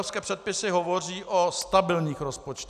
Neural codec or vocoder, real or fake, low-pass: none; real; 14.4 kHz